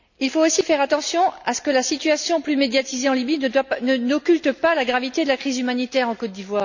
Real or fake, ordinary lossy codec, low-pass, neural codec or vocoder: real; none; 7.2 kHz; none